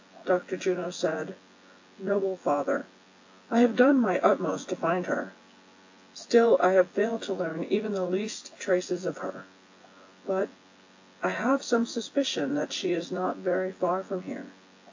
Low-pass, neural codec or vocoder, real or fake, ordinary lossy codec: 7.2 kHz; vocoder, 24 kHz, 100 mel bands, Vocos; fake; AAC, 48 kbps